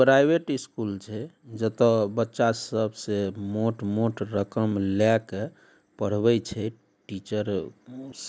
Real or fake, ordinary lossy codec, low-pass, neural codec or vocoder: real; none; none; none